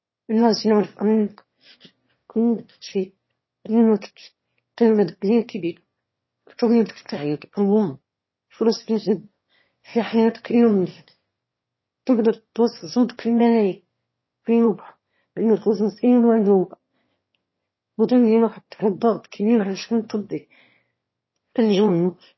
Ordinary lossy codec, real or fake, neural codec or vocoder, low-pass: MP3, 24 kbps; fake; autoencoder, 22.05 kHz, a latent of 192 numbers a frame, VITS, trained on one speaker; 7.2 kHz